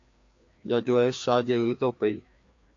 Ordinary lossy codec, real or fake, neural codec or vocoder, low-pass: AAC, 48 kbps; fake; codec, 16 kHz, 2 kbps, FreqCodec, larger model; 7.2 kHz